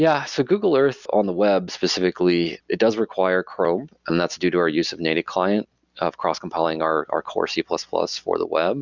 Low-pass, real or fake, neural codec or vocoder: 7.2 kHz; real; none